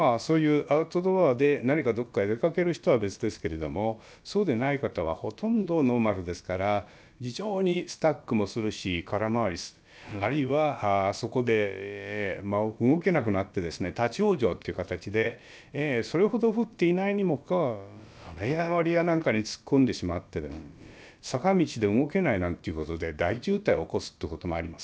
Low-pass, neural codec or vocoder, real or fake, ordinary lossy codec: none; codec, 16 kHz, about 1 kbps, DyCAST, with the encoder's durations; fake; none